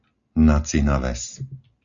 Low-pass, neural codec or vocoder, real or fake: 7.2 kHz; none; real